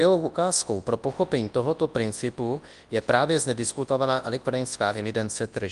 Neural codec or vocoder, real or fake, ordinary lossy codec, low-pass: codec, 24 kHz, 0.9 kbps, WavTokenizer, large speech release; fake; Opus, 32 kbps; 10.8 kHz